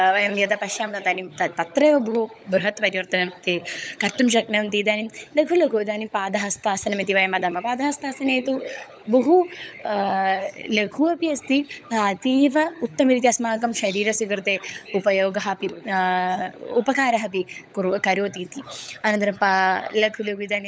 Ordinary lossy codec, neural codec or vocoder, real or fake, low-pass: none; codec, 16 kHz, 16 kbps, FunCodec, trained on LibriTTS, 50 frames a second; fake; none